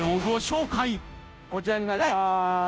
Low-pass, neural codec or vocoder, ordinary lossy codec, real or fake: none; codec, 16 kHz, 0.5 kbps, FunCodec, trained on Chinese and English, 25 frames a second; none; fake